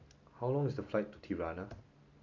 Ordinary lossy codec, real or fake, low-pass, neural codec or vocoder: none; real; 7.2 kHz; none